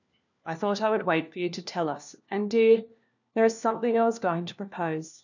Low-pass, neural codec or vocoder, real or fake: 7.2 kHz; codec, 16 kHz, 1 kbps, FunCodec, trained on LibriTTS, 50 frames a second; fake